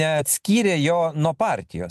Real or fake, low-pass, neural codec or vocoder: real; 14.4 kHz; none